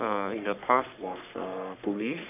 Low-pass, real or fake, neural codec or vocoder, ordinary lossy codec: 3.6 kHz; fake; codec, 44.1 kHz, 3.4 kbps, Pupu-Codec; none